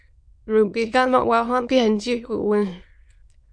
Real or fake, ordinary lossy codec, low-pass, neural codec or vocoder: fake; MP3, 64 kbps; 9.9 kHz; autoencoder, 22.05 kHz, a latent of 192 numbers a frame, VITS, trained on many speakers